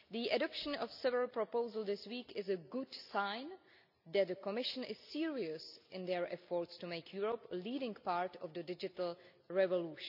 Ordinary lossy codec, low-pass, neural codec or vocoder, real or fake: none; 5.4 kHz; none; real